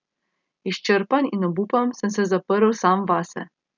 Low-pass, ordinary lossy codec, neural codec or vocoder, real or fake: 7.2 kHz; none; none; real